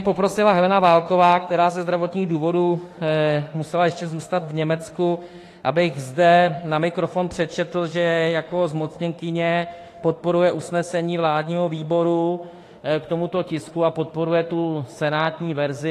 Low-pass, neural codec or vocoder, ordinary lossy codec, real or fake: 14.4 kHz; autoencoder, 48 kHz, 32 numbers a frame, DAC-VAE, trained on Japanese speech; AAC, 48 kbps; fake